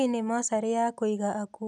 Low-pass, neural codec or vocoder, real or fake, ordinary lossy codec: none; none; real; none